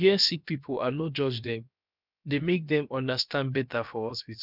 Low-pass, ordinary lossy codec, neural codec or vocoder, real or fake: 5.4 kHz; none; codec, 16 kHz, about 1 kbps, DyCAST, with the encoder's durations; fake